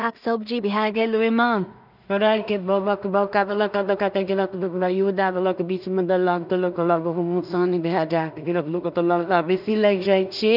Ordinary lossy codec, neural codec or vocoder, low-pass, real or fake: none; codec, 16 kHz in and 24 kHz out, 0.4 kbps, LongCat-Audio-Codec, two codebook decoder; 5.4 kHz; fake